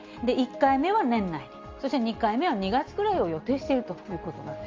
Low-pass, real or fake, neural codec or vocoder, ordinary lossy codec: 7.2 kHz; real; none; Opus, 32 kbps